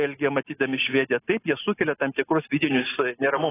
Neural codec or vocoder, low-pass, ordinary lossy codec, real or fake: none; 3.6 kHz; AAC, 16 kbps; real